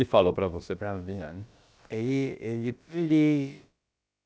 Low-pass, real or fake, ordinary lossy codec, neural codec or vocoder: none; fake; none; codec, 16 kHz, about 1 kbps, DyCAST, with the encoder's durations